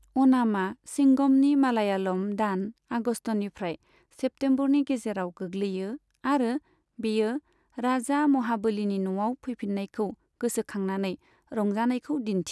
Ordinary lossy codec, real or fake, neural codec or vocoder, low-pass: none; real; none; none